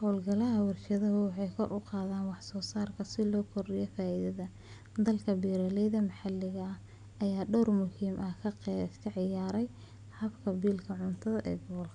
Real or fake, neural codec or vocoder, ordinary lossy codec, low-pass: real; none; none; 9.9 kHz